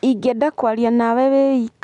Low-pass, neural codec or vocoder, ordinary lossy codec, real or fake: 10.8 kHz; none; none; real